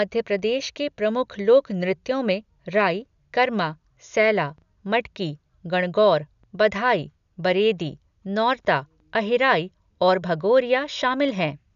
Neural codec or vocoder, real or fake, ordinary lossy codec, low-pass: none; real; none; 7.2 kHz